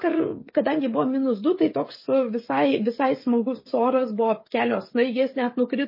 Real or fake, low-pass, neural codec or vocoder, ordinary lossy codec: fake; 5.4 kHz; codec, 16 kHz, 16 kbps, FreqCodec, smaller model; MP3, 24 kbps